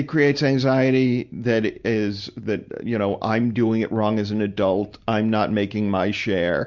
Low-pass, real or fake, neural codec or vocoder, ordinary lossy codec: 7.2 kHz; real; none; Opus, 64 kbps